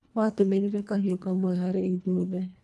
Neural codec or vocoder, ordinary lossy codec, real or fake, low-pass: codec, 24 kHz, 1.5 kbps, HILCodec; none; fake; none